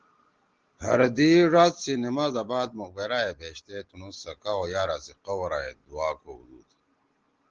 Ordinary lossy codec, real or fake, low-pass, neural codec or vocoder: Opus, 16 kbps; real; 7.2 kHz; none